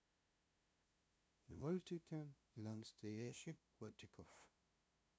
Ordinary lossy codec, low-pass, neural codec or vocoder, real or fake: none; none; codec, 16 kHz, 0.5 kbps, FunCodec, trained on LibriTTS, 25 frames a second; fake